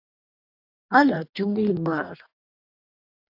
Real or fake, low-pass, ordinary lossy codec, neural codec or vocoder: fake; 5.4 kHz; AAC, 48 kbps; codec, 44.1 kHz, 2.6 kbps, DAC